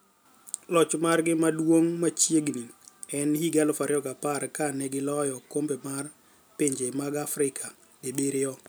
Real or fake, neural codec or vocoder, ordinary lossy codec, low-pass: real; none; none; none